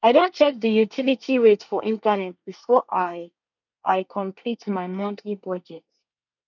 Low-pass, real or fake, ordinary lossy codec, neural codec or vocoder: 7.2 kHz; fake; none; codec, 24 kHz, 1 kbps, SNAC